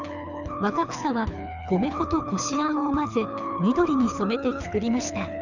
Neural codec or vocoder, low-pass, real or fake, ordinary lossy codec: codec, 24 kHz, 6 kbps, HILCodec; 7.2 kHz; fake; none